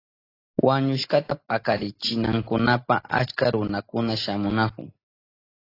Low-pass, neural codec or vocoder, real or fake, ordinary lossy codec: 5.4 kHz; none; real; AAC, 24 kbps